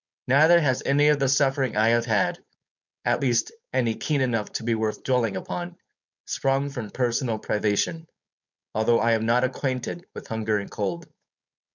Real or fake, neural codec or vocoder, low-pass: fake; codec, 16 kHz, 4.8 kbps, FACodec; 7.2 kHz